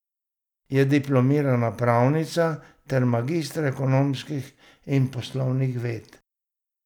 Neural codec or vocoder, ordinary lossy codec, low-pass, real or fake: none; none; 19.8 kHz; real